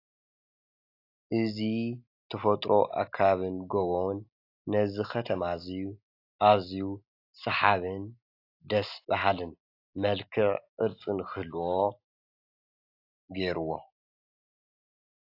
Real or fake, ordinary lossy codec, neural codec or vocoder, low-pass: real; AAC, 32 kbps; none; 5.4 kHz